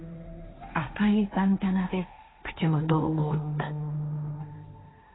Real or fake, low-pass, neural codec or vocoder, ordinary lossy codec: fake; 7.2 kHz; codec, 16 kHz, 1.1 kbps, Voila-Tokenizer; AAC, 16 kbps